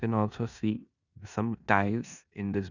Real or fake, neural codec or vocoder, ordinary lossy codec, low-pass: fake; codec, 16 kHz in and 24 kHz out, 0.9 kbps, LongCat-Audio-Codec, four codebook decoder; none; 7.2 kHz